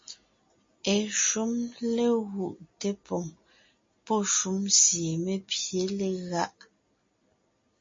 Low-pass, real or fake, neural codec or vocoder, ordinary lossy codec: 7.2 kHz; real; none; MP3, 32 kbps